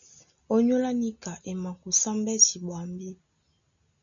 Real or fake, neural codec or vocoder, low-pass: real; none; 7.2 kHz